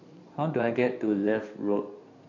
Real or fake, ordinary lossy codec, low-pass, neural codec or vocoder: fake; none; 7.2 kHz; codec, 16 kHz in and 24 kHz out, 2.2 kbps, FireRedTTS-2 codec